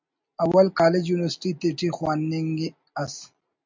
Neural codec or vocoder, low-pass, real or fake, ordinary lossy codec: none; 7.2 kHz; real; MP3, 64 kbps